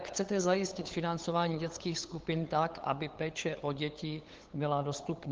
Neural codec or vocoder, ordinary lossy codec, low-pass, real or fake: codec, 16 kHz, 8 kbps, FunCodec, trained on LibriTTS, 25 frames a second; Opus, 16 kbps; 7.2 kHz; fake